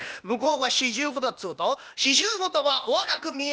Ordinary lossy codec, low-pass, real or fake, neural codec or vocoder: none; none; fake; codec, 16 kHz, 0.8 kbps, ZipCodec